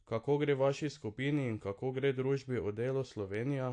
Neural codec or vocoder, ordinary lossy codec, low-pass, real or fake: none; AAC, 48 kbps; 9.9 kHz; real